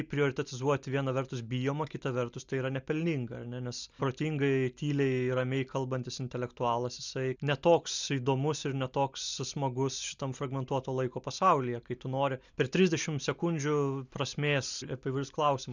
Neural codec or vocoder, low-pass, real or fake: none; 7.2 kHz; real